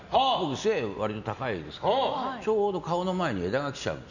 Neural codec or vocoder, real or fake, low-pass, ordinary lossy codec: none; real; 7.2 kHz; none